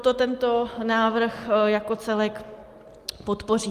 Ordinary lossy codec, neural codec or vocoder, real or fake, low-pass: Opus, 32 kbps; none; real; 14.4 kHz